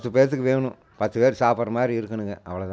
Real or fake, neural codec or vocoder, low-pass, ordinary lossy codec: real; none; none; none